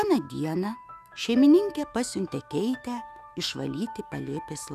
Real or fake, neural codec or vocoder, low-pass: fake; autoencoder, 48 kHz, 128 numbers a frame, DAC-VAE, trained on Japanese speech; 14.4 kHz